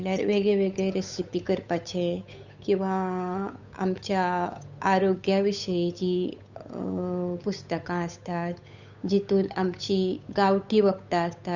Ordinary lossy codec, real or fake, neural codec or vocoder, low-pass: Opus, 64 kbps; fake; codec, 16 kHz, 8 kbps, FunCodec, trained on Chinese and English, 25 frames a second; 7.2 kHz